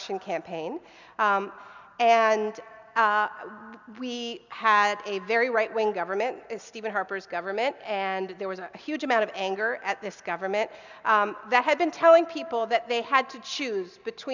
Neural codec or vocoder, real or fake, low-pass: none; real; 7.2 kHz